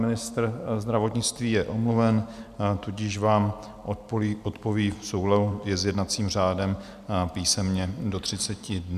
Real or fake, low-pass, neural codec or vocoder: real; 14.4 kHz; none